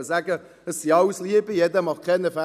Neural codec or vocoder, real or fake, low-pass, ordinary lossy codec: none; real; 14.4 kHz; none